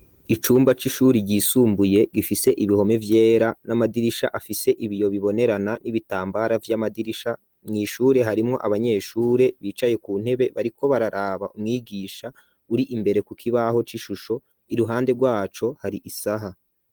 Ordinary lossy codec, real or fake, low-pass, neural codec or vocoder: Opus, 24 kbps; real; 19.8 kHz; none